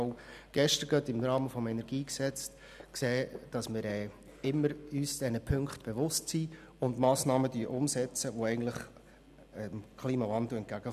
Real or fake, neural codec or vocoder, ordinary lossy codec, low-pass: real; none; none; 14.4 kHz